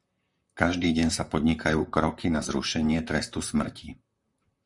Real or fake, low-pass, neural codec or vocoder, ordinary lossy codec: fake; 10.8 kHz; vocoder, 44.1 kHz, 128 mel bands, Pupu-Vocoder; Opus, 64 kbps